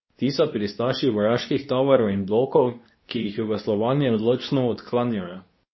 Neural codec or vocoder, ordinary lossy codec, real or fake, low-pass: codec, 24 kHz, 0.9 kbps, WavTokenizer, medium speech release version 2; MP3, 24 kbps; fake; 7.2 kHz